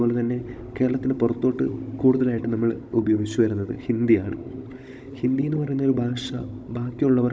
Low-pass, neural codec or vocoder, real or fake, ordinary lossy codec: none; codec, 16 kHz, 16 kbps, FunCodec, trained on Chinese and English, 50 frames a second; fake; none